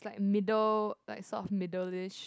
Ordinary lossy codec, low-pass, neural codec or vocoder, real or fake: none; none; none; real